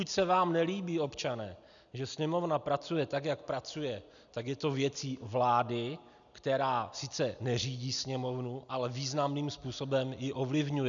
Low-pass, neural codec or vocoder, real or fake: 7.2 kHz; none; real